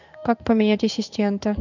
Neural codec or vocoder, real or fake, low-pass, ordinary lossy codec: codec, 16 kHz in and 24 kHz out, 1 kbps, XY-Tokenizer; fake; 7.2 kHz; none